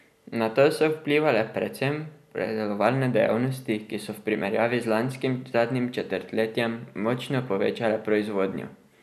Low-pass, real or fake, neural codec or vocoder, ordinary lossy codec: 14.4 kHz; real; none; none